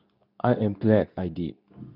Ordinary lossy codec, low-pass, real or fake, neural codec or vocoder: none; 5.4 kHz; fake; codec, 24 kHz, 0.9 kbps, WavTokenizer, medium speech release version 2